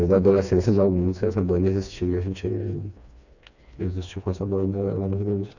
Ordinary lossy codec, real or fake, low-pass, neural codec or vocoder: none; fake; 7.2 kHz; codec, 16 kHz, 2 kbps, FreqCodec, smaller model